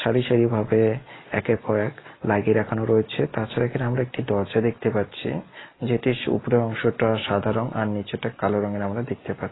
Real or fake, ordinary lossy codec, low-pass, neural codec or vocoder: real; AAC, 16 kbps; 7.2 kHz; none